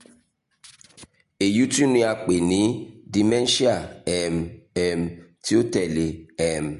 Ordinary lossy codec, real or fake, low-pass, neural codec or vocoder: MP3, 48 kbps; real; 14.4 kHz; none